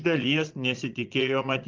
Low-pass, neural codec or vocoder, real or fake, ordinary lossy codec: 7.2 kHz; vocoder, 22.05 kHz, 80 mel bands, Vocos; fake; Opus, 32 kbps